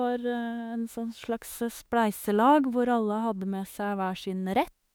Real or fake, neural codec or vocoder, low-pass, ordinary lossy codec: fake; autoencoder, 48 kHz, 32 numbers a frame, DAC-VAE, trained on Japanese speech; none; none